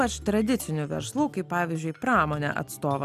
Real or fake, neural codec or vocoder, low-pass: real; none; 14.4 kHz